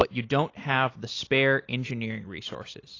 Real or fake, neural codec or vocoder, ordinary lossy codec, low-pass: real; none; AAC, 32 kbps; 7.2 kHz